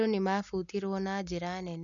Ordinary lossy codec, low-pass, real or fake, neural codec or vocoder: none; 7.2 kHz; real; none